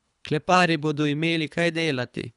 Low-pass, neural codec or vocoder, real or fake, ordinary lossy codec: 10.8 kHz; codec, 24 kHz, 3 kbps, HILCodec; fake; none